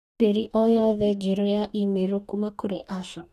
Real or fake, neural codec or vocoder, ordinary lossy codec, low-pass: fake; codec, 44.1 kHz, 2.6 kbps, DAC; none; 14.4 kHz